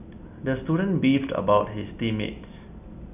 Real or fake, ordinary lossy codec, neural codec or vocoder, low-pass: real; none; none; 3.6 kHz